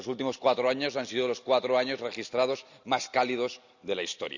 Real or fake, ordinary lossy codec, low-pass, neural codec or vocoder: real; none; 7.2 kHz; none